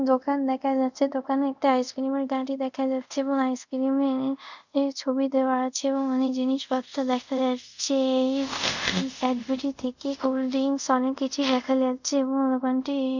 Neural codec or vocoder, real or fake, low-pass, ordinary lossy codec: codec, 24 kHz, 0.5 kbps, DualCodec; fake; 7.2 kHz; none